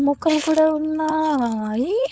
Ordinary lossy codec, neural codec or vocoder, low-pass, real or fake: none; codec, 16 kHz, 4.8 kbps, FACodec; none; fake